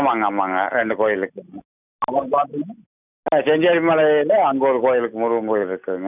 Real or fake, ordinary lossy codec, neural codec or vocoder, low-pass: real; none; none; 3.6 kHz